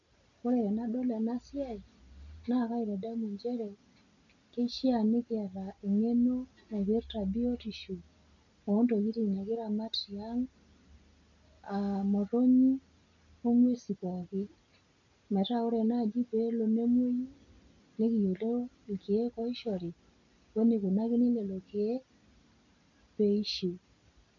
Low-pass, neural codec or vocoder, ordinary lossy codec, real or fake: 7.2 kHz; none; none; real